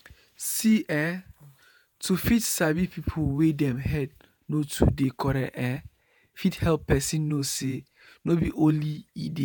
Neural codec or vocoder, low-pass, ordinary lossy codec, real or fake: vocoder, 48 kHz, 128 mel bands, Vocos; none; none; fake